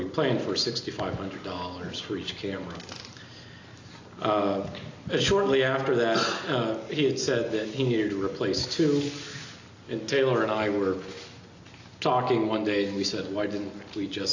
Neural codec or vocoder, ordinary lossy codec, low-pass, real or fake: none; AAC, 48 kbps; 7.2 kHz; real